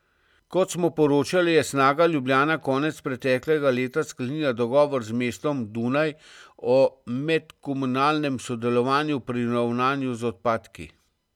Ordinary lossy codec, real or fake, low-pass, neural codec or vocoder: none; real; 19.8 kHz; none